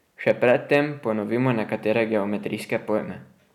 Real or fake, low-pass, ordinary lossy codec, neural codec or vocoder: real; 19.8 kHz; none; none